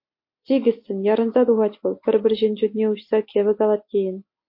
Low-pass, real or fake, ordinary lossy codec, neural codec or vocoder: 5.4 kHz; real; MP3, 32 kbps; none